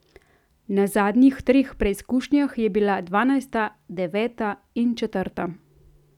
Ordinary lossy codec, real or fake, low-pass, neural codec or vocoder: none; real; 19.8 kHz; none